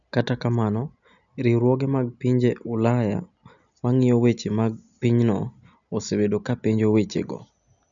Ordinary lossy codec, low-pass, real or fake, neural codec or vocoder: none; 7.2 kHz; real; none